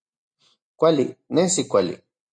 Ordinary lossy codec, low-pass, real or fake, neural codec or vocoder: MP3, 48 kbps; 9.9 kHz; real; none